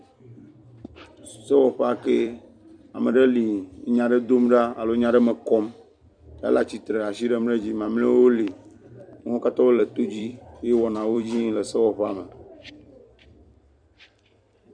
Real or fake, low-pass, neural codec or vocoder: fake; 9.9 kHz; vocoder, 44.1 kHz, 128 mel bands every 256 samples, BigVGAN v2